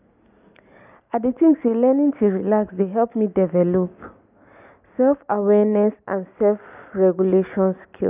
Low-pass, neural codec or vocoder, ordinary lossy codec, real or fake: 3.6 kHz; none; none; real